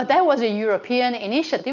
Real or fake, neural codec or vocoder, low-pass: real; none; 7.2 kHz